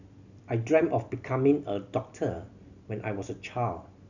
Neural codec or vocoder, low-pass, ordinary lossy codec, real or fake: none; 7.2 kHz; none; real